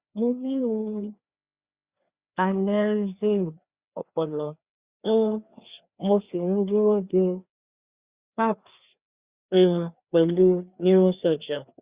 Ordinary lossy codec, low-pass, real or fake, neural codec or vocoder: Opus, 64 kbps; 3.6 kHz; fake; codec, 16 kHz, 2 kbps, FreqCodec, larger model